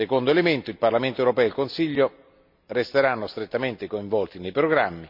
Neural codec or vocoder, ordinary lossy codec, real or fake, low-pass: none; none; real; 5.4 kHz